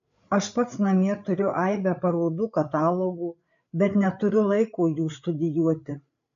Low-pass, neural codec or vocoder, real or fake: 7.2 kHz; codec, 16 kHz, 8 kbps, FreqCodec, larger model; fake